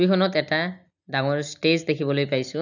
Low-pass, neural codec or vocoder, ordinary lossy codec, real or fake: 7.2 kHz; none; none; real